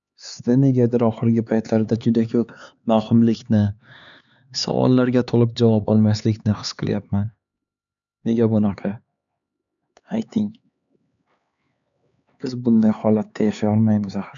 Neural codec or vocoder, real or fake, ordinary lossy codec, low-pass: codec, 16 kHz, 4 kbps, X-Codec, HuBERT features, trained on LibriSpeech; fake; none; 7.2 kHz